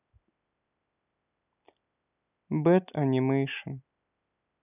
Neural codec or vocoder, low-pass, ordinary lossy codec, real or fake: none; 3.6 kHz; none; real